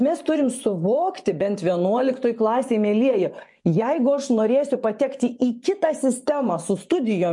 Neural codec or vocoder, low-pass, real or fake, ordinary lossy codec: vocoder, 24 kHz, 100 mel bands, Vocos; 10.8 kHz; fake; MP3, 64 kbps